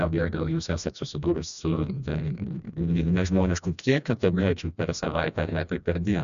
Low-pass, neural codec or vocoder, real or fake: 7.2 kHz; codec, 16 kHz, 1 kbps, FreqCodec, smaller model; fake